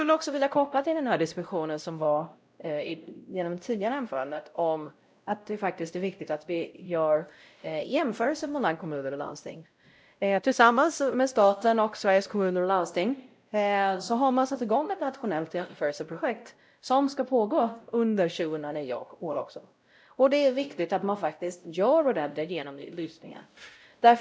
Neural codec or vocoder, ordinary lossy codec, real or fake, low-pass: codec, 16 kHz, 0.5 kbps, X-Codec, WavLM features, trained on Multilingual LibriSpeech; none; fake; none